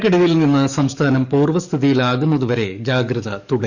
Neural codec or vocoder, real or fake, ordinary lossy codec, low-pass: codec, 44.1 kHz, 7.8 kbps, DAC; fake; none; 7.2 kHz